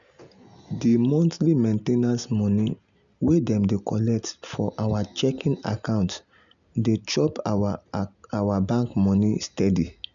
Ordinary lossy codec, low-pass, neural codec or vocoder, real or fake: none; 7.2 kHz; none; real